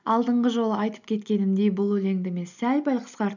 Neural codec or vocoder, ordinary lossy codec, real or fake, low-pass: none; none; real; 7.2 kHz